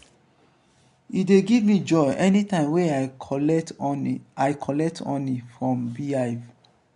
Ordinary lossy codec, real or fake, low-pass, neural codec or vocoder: MP3, 64 kbps; real; 10.8 kHz; none